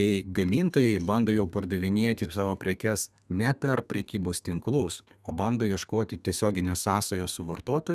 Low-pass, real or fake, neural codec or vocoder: 14.4 kHz; fake; codec, 32 kHz, 1.9 kbps, SNAC